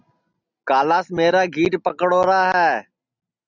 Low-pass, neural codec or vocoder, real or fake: 7.2 kHz; none; real